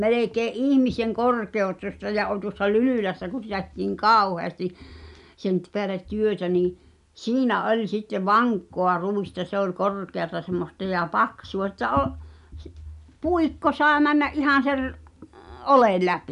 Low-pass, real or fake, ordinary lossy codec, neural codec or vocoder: 10.8 kHz; real; none; none